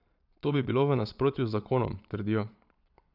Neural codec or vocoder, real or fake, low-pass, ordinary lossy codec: vocoder, 22.05 kHz, 80 mel bands, Vocos; fake; 5.4 kHz; none